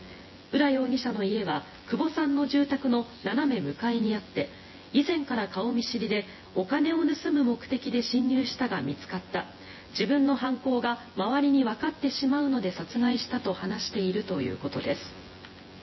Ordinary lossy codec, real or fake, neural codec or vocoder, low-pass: MP3, 24 kbps; fake; vocoder, 24 kHz, 100 mel bands, Vocos; 7.2 kHz